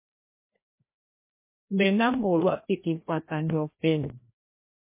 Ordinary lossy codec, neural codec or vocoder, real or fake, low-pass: MP3, 24 kbps; codec, 16 kHz, 1 kbps, FreqCodec, larger model; fake; 3.6 kHz